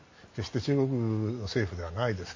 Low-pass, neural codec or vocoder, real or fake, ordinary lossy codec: 7.2 kHz; none; real; MP3, 32 kbps